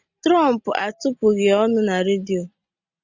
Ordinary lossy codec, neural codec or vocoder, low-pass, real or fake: Opus, 64 kbps; none; 7.2 kHz; real